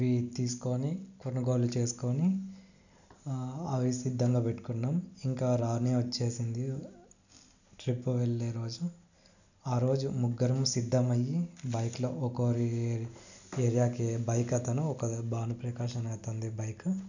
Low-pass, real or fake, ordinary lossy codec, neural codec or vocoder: 7.2 kHz; real; none; none